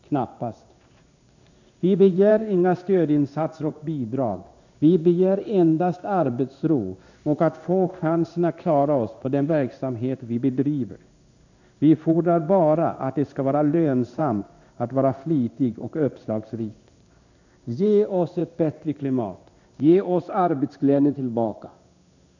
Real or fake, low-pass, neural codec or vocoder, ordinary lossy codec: fake; 7.2 kHz; codec, 16 kHz in and 24 kHz out, 1 kbps, XY-Tokenizer; none